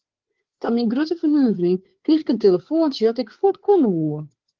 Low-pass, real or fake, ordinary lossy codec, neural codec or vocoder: 7.2 kHz; fake; Opus, 16 kbps; codec, 16 kHz, 4 kbps, FreqCodec, larger model